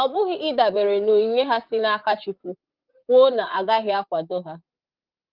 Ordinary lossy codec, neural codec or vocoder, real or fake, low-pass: Opus, 32 kbps; vocoder, 44.1 kHz, 128 mel bands, Pupu-Vocoder; fake; 5.4 kHz